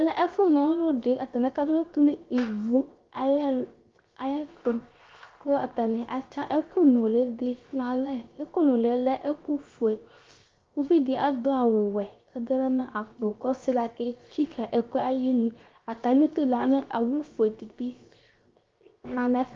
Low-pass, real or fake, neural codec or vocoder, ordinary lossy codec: 7.2 kHz; fake; codec, 16 kHz, 0.7 kbps, FocalCodec; Opus, 32 kbps